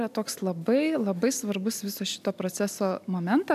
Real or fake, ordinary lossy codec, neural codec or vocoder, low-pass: real; MP3, 96 kbps; none; 14.4 kHz